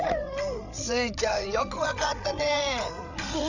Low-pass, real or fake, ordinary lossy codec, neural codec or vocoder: 7.2 kHz; fake; none; codec, 16 kHz, 8 kbps, FreqCodec, larger model